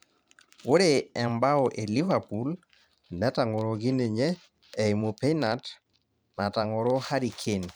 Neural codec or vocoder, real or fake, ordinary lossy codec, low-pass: vocoder, 44.1 kHz, 128 mel bands every 512 samples, BigVGAN v2; fake; none; none